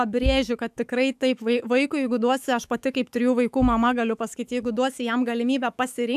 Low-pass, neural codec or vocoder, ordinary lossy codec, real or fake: 14.4 kHz; autoencoder, 48 kHz, 128 numbers a frame, DAC-VAE, trained on Japanese speech; AAC, 96 kbps; fake